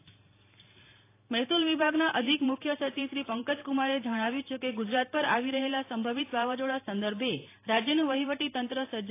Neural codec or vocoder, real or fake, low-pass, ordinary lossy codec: vocoder, 44.1 kHz, 128 mel bands every 512 samples, BigVGAN v2; fake; 3.6 kHz; AAC, 24 kbps